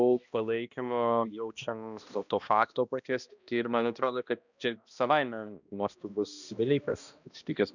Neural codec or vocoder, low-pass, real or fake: codec, 16 kHz, 1 kbps, X-Codec, HuBERT features, trained on balanced general audio; 7.2 kHz; fake